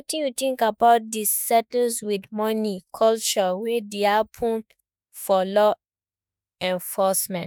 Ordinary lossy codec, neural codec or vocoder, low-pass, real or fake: none; autoencoder, 48 kHz, 32 numbers a frame, DAC-VAE, trained on Japanese speech; none; fake